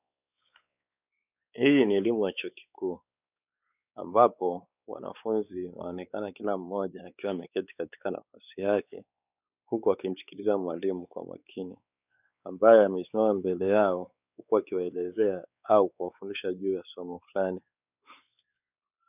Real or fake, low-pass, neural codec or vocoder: fake; 3.6 kHz; codec, 16 kHz, 4 kbps, X-Codec, WavLM features, trained on Multilingual LibriSpeech